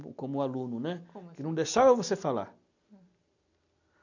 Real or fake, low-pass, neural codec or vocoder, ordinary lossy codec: real; 7.2 kHz; none; none